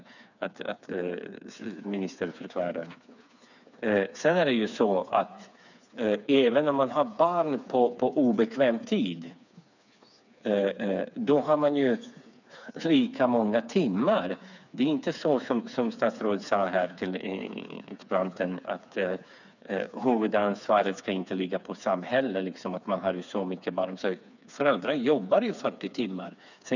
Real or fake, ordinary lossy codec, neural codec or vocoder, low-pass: fake; none; codec, 16 kHz, 4 kbps, FreqCodec, smaller model; 7.2 kHz